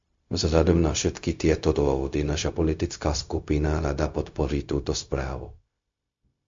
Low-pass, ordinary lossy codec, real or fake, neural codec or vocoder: 7.2 kHz; MP3, 48 kbps; fake; codec, 16 kHz, 0.4 kbps, LongCat-Audio-Codec